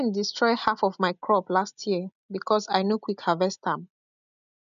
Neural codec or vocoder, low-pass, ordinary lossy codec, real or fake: none; 7.2 kHz; none; real